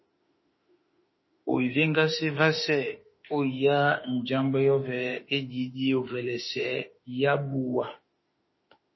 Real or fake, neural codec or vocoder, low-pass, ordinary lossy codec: fake; autoencoder, 48 kHz, 32 numbers a frame, DAC-VAE, trained on Japanese speech; 7.2 kHz; MP3, 24 kbps